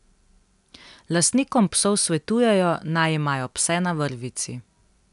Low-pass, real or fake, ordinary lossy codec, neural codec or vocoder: 10.8 kHz; real; none; none